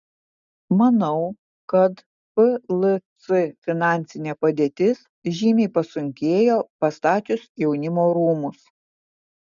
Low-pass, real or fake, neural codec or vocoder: 7.2 kHz; real; none